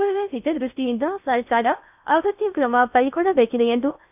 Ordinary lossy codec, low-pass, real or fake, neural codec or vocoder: none; 3.6 kHz; fake; codec, 16 kHz in and 24 kHz out, 0.6 kbps, FocalCodec, streaming, 2048 codes